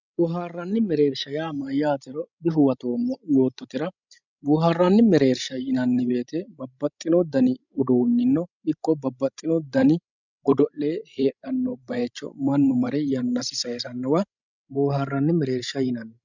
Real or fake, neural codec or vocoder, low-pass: fake; codec, 16 kHz, 16 kbps, FreqCodec, larger model; 7.2 kHz